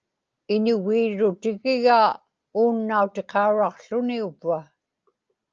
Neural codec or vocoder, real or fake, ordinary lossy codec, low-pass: none; real; Opus, 24 kbps; 7.2 kHz